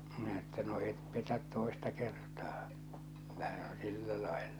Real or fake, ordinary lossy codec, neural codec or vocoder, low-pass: real; none; none; none